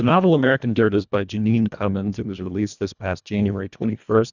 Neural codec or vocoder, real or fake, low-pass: codec, 24 kHz, 1.5 kbps, HILCodec; fake; 7.2 kHz